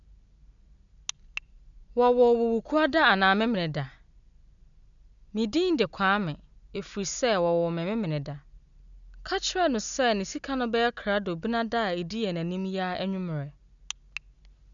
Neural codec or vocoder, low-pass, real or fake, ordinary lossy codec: none; 7.2 kHz; real; none